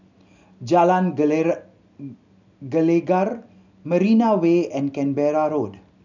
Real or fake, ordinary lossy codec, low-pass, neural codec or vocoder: real; none; 7.2 kHz; none